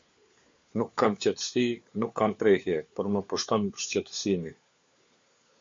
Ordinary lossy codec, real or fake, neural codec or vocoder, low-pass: MP3, 48 kbps; fake; codec, 16 kHz, 4 kbps, FunCodec, trained on LibriTTS, 50 frames a second; 7.2 kHz